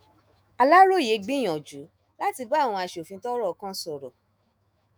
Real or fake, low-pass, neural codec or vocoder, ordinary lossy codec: fake; none; autoencoder, 48 kHz, 128 numbers a frame, DAC-VAE, trained on Japanese speech; none